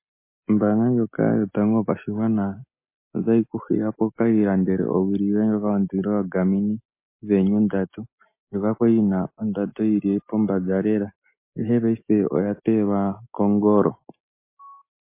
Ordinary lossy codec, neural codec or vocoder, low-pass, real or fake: MP3, 24 kbps; none; 3.6 kHz; real